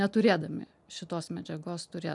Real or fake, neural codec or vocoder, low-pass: real; none; 10.8 kHz